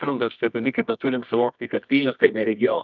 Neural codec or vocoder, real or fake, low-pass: codec, 24 kHz, 0.9 kbps, WavTokenizer, medium music audio release; fake; 7.2 kHz